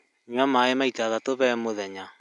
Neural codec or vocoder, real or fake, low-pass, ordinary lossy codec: none; real; 10.8 kHz; none